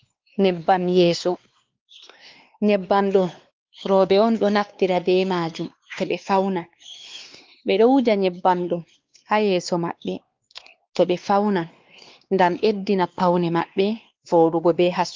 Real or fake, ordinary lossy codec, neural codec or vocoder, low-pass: fake; Opus, 32 kbps; codec, 16 kHz, 2 kbps, X-Codec, WavLM features, trained on Multilingual LibriSpeech; 7.2 kHz